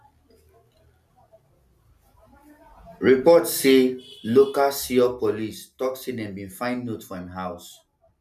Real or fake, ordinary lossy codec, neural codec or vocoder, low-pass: real; none; none; 14.4 kHz